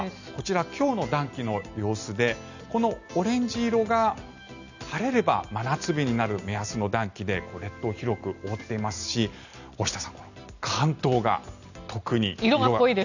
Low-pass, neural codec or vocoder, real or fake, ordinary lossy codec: 7.2 kHz; none; real; none